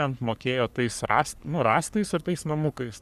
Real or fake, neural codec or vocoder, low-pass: fake; codec, 44.1 kHz, 3.4 kbps, Pupu-Codec; 14.4 kHz